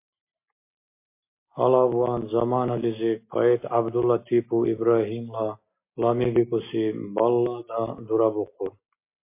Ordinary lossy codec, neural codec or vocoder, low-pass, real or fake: MP3, 24 kbps; none; 3.6 kHz; real